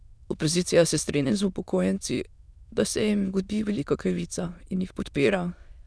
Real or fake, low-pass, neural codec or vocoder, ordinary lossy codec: fake; none; autoencoder, 22.05 kHz, a latent of 192 numbers a frame, VITS, trained on many speakers; none